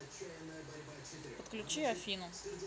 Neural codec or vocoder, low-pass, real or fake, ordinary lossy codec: none; none; real; none